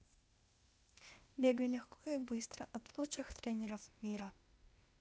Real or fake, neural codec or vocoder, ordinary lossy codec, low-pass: fake; codec, 16 kHz, 0.8 kbps, ZipCodec; none; none